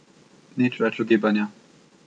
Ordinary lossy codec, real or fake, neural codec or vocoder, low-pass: none; real; none; 9.9 kHz